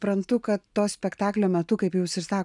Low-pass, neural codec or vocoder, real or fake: 10.8 kHz; none; real